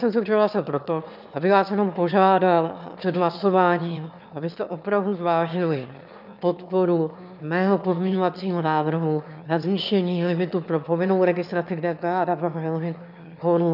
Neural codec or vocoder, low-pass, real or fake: autoencoder, 22.05 kHz, a latent of 192 numbers a frame, VITS, trained on one speaker; 5.4 kHz; fake